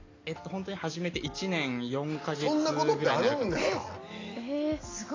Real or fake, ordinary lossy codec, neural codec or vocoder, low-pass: real; AAC, 32 kbps; none; 7.2 kHz